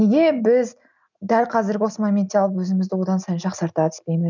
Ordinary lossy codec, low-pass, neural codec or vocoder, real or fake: none; 7.2 kHz; none; real